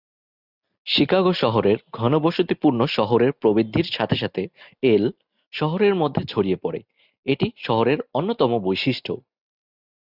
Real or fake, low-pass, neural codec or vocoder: real; 5.4 kHz; none